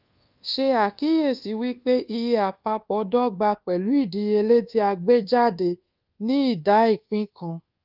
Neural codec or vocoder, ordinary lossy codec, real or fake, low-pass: codec, 24 kHz, 1.2 kbps, DualCodec; Opus, 24 kbps; fake; 5.4 kHz